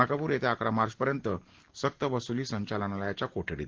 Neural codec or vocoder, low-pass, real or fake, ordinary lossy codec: none; 7.2 kHz; real; Opus, 16 kbps